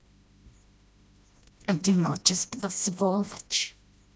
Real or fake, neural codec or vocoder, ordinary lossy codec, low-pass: fake; codec, 16 kHz, 1 kbps, FreqCodec, smaller model; none; none